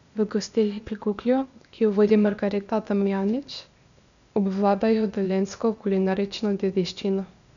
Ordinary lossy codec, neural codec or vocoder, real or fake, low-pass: none; codec, 16 kHz, 0.8 kbps, ZipCodec; fake; 7.2 kHz